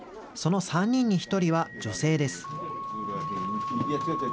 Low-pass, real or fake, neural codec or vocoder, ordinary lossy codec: none; real; none; none